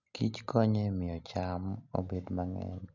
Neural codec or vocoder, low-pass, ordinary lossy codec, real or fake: none; 7.2 kHz; none; real